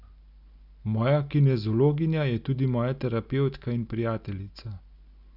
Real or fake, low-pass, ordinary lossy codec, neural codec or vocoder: real; 5.4 kHz; Opus, 64 kbps; none